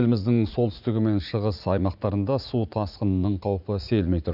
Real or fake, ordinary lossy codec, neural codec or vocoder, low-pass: fake; none; vocoder, 44.1 kHz, 80 mel bands, Vocos; 5.4 kHz